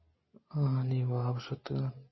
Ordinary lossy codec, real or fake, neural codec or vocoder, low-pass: MP3, 24 kbps; real; none; 7.2 kHz